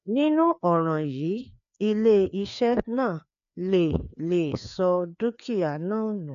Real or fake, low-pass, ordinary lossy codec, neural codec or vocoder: fake; 7.2 kHz; AAC, 96 kbps; codec, 16 kHz, 2 kbps, FreqCodec, larger model